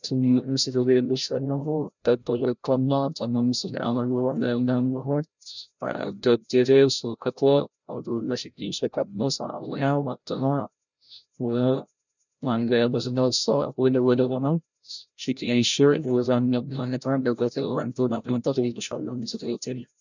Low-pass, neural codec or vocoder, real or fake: 7.2 kHz; codec, 16 kHz, 0.5 kbps, FreqCodec, larger model; fake